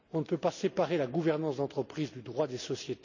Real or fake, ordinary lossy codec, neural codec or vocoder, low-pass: real; none; none; 7.2 kHz